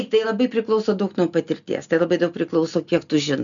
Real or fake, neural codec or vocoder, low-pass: real; none; 7.2 kHz